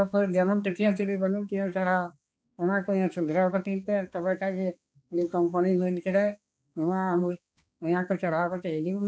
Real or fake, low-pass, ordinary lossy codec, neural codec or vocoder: fake; none; none; codec, 16 kHz, 2 kbps, X-Codec, HuBERT features, trained on balanced general audio